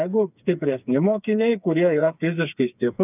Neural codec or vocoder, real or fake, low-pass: codec, 16 kHz, 4 kbps, FreqCodec, smaller model; fake; 3.6 kHz